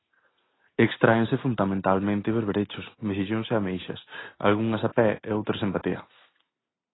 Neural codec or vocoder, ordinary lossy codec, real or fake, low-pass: none; AAC, 16 kbps; real; 7.2 kHz